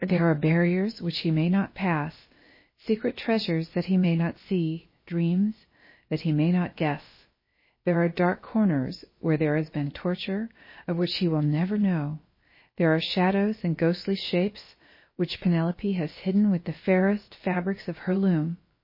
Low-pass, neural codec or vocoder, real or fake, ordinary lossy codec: 5.4 kHz; codec, 16 kHz, about 1 kbps, DyCAST, with the encoder's durations; fake; MP3, 24 kbps